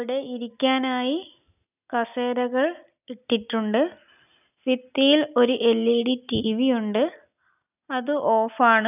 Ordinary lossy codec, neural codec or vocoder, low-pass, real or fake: none; none; 3.6 kHz; real